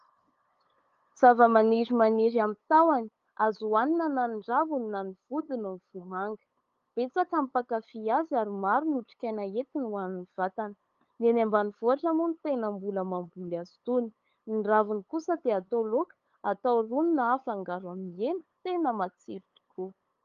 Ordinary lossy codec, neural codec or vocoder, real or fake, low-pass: Opus, 32 kbps; codec, 16 kHz, 8 kbps, FunCodec, trained on LibriTTS, 25 frames a second; fake; 7.2 kHz